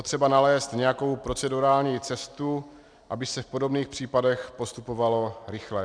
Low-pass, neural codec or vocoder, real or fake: 9.9 kHz; none; real